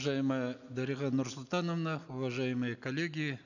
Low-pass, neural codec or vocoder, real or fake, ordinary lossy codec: 7.2 kHz; none; real; none